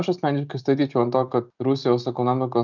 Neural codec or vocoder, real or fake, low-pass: none; real; 7.2 kHz